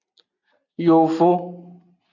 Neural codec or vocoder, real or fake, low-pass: none; real; 7.2 kHz